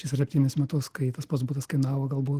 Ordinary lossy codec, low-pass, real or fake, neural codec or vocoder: Opus, 16 kbps; 14.4 kHz; real; none